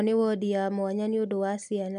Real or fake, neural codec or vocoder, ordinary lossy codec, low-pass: real; none; none; 10.8 kHz